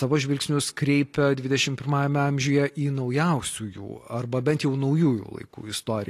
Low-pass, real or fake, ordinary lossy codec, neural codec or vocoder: 14.4 kHz; real; AAC, 48 kbps; none